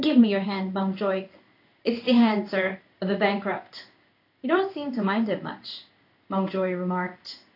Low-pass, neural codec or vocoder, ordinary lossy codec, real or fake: 5.4 kHz; codec, 16 kHz in and 24 kHz out, 1 kbps, XY-Tokenizer; AAC, 32 kbps; fake